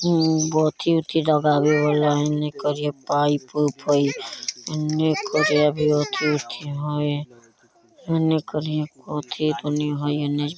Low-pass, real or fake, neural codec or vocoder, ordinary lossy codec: none; real; none; none